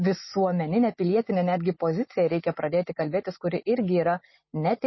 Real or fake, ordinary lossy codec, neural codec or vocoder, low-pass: real; MP3, 24 kbps; none; 7.2 kHz